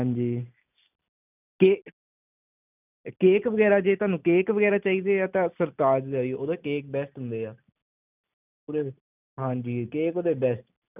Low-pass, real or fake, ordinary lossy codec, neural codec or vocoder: 3.6 kHz; real; none; none